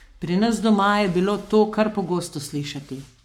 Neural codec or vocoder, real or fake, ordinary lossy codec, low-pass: codec, 44.1 kHz, 7.8 kbps, Pupu-Codec; fake; none; 19.8 kHz